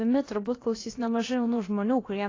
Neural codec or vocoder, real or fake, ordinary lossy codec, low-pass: codec, 16 kHz, about 1 kbps, DyCAST, with the encoder's durations; fake; AAC, 32 kbps; 7.2 kHz